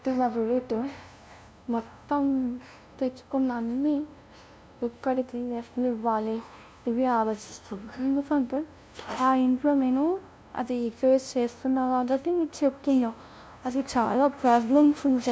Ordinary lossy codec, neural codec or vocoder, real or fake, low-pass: none; codec, 16 kHz, 0.5 kbps, FunCodec, trained on LibriTTS, 25 frames a second; fake; none